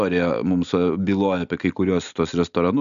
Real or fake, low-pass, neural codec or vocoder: real; 7.2 kHz; none